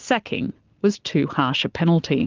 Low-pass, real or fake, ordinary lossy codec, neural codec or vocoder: 7.2 kHz; real; Opus, 32 kbps; none